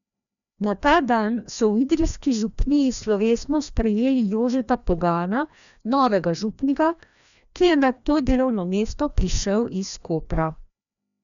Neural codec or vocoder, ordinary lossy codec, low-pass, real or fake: codec, 16 kHz, 1 kbps, FreqCodec, larger model; none; 7.2 kHz; fake